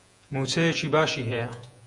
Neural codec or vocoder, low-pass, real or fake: vocoder, 48 kHz, 128 mel bands, Vocos; 10.8 kHz; fake